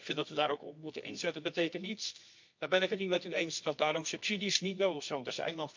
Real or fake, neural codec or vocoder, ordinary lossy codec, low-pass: fake; codec, 24 kHz, 0.9 kbps, WavTokenizer, medium music audio release; MP3, 48 kbps; 7.2 kHz